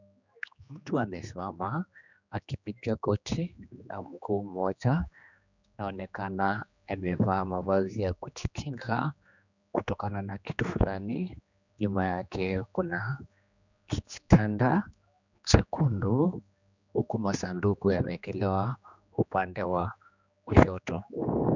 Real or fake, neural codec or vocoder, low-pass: fake; codec, 16 kHz, 2 kbps, X-Codec, HuBERT features, trained on general audio; 7.2 kHz